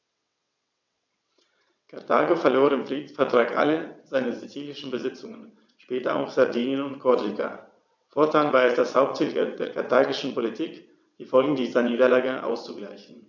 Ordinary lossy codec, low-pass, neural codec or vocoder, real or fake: none; 7.2 kHz; vocoder, 22.05 kHz, 80 mel bands, WaveNeXt; fake